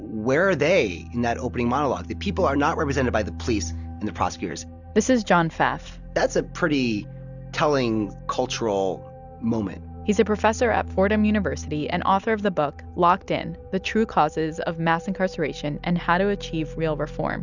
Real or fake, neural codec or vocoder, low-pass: real; none; 7.2 kHz